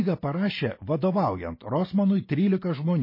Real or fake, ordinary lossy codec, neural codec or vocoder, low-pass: real; MP3, 24 kbps; none; 5.4 kHz